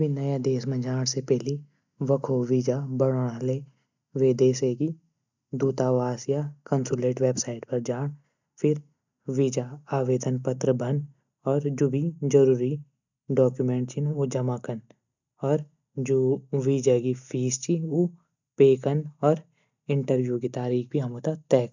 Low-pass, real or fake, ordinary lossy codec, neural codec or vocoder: 7.2 kHz; real; none; none